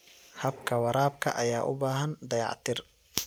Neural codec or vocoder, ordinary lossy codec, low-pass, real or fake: none; none; none; real